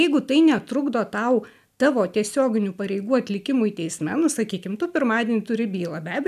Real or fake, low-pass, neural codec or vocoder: real; 14.4 kHz; none